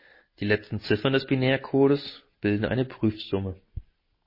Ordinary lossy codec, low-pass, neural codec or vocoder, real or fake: MP3, 24 kbps; 5.4 kHz; none; real